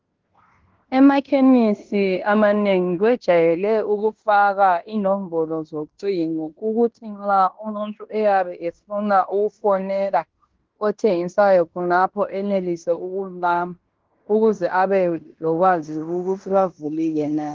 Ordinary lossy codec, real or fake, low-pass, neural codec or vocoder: Opus, 16 kbps; fake; 7.2 kHz; codec, 16 kHz in and 24 kHz out, 0.9 kbps, LongCat-Audio-Codec, fine tuned four codebook decoder